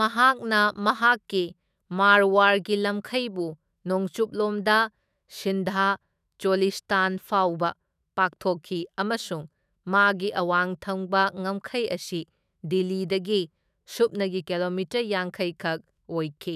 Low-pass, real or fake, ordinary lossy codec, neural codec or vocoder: 14.4 kHz; fake; none; autoencoder, 48 kHz, 128 numbers a frame, DAC-VAE, trained on Japanese speech